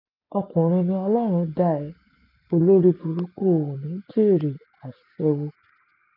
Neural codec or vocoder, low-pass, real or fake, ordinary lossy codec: none; 5.4 kHz; real; none